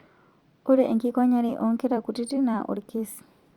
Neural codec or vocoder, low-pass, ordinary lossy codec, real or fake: vocoder, 44.1 kHz, 128 mel bands every 256 samples, BigVGAN v2; 19.8 kHz; Opus, 64 kbps; fake